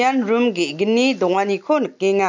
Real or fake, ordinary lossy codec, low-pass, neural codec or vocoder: real; MP3, 64 kbps; 7.2 kHz; none